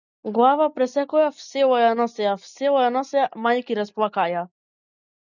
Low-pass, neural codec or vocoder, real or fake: 7.2 kHz; none; real